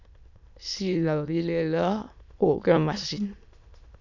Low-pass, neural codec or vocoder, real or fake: 7.2 kHz; autoencoder, 22.05 kHz, a latent of 192 numbers a frame, VITS, trained on many speakers; fake